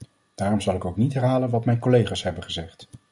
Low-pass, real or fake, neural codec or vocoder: 10.8 kHz; real; none